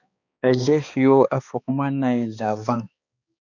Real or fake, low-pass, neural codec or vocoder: fake; 7.2 kHz; codec, 16 kHz, 2 kbps, X-Codec, HuBERT features, trained on balanced general audio